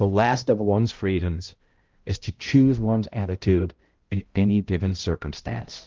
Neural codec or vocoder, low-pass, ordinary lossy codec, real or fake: codec, 16 kHz, 0.5 kbps, X-Codec, HuBERT features, trained on balanced general audio; 7.2 kHz; Opus, 16 kbps; fake